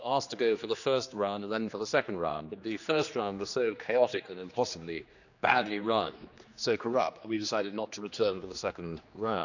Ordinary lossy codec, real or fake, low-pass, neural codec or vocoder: none; fake; 7.2 kHz; codec, 16 kHz, 2 kbps, X-Codec, HuBERT features, trained on general audio